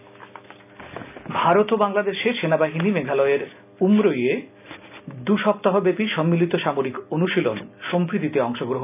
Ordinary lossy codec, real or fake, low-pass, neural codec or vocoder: none; real; 3.6 kHz; none